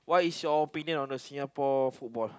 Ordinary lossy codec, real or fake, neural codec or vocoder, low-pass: none; real; none; none